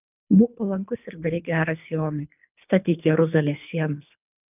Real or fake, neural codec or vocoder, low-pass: fake; codec, 24 kHz, 3 kbps, HILCodec; 3.6 kHz